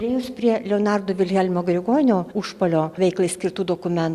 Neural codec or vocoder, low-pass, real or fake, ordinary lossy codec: none; 14.4 kHz; real; Opus, 64 kbps